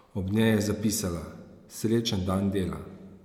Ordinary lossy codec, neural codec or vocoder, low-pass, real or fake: MP3, 96 kbps; none; 19.8 kHz; real